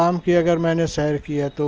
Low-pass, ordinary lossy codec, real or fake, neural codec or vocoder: 7.2 kHz; Opus, 24 kbps; real; none